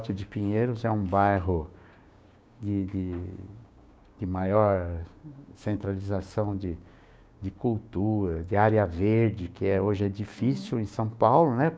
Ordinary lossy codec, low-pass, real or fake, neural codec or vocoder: none; none; fake; codec, 16 kHz, 6 kbps, DAC